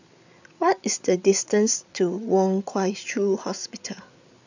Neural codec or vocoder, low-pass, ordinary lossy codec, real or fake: codec, 16 kHz, 8 kbps, FreqCodec, larger model; 7.2 kHz; none; fake